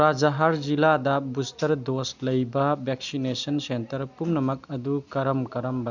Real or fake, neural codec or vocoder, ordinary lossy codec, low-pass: real; none; none; 7.2 kHz